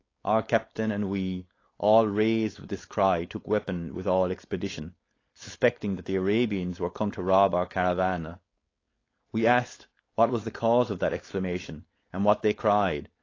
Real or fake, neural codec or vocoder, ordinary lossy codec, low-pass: fake; codec, 16 kHz, 4.8 kbps, FACodec; AAC, 32 kbps; 7.2 kHz